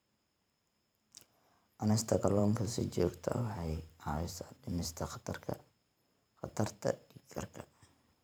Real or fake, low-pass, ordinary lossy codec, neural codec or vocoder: fake; none; none; vocoder, 44.1 kHz, 128 mel bands every 512 samples, BigVGAN v2